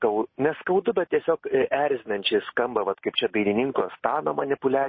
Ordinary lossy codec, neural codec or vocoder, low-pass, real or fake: MP3, 24 kbps; none; 7.2 kHz; real